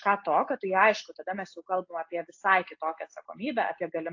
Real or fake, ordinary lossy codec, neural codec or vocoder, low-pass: real; AAC, 48 kbps; none; 7.2 kHz